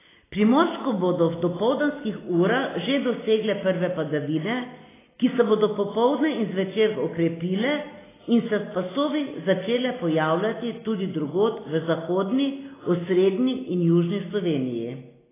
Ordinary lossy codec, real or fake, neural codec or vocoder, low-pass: AAC, 16 kbps; real; none; 3.6 kHz